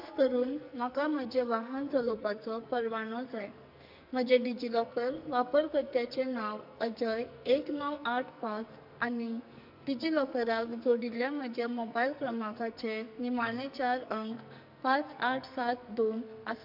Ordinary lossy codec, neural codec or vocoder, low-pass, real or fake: none; codec, 44.1 kHz, 2.6 kbps, SNAC; 5.4 kHz; fake